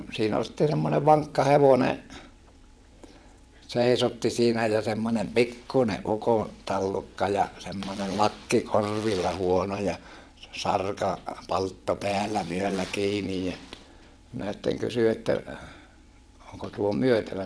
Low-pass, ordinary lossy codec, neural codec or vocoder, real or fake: none; none; vocoder, 22.05 kHz, 80 mel bands, WaveNeXt; fake